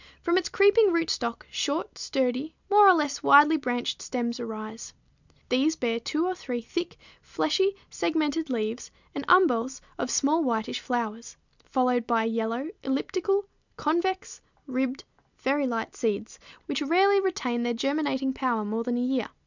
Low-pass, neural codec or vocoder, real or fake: 7.2 kHz; none; real